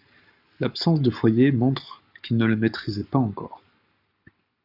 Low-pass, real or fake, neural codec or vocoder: 5.4 kHz; fake; codec, 44.1 kHz, 7.8 kbps, Pupu-Codec